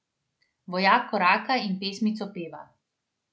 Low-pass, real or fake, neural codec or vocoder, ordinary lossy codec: none; real; none; none